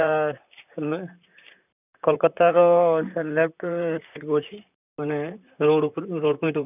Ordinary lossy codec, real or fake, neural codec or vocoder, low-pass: none; fake; vocoder, 44.1 kHz, 128 mel bands, Pupu-Vocoder; 3.6 kHz